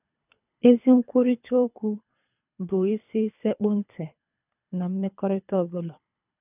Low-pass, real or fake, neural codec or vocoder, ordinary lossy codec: 3.6 kHz; fake; codec, 24 kHz, 3 kbps, HILCodec; none